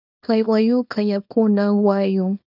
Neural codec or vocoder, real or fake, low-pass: codec, 24 kHz, 0.9 kbps, WavTokenizer, small release; fake; 5.4 kHz